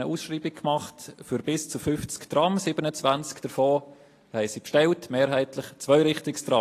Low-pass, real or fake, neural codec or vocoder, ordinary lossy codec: 14.4 kHz; real; none; AAC, 48 kbps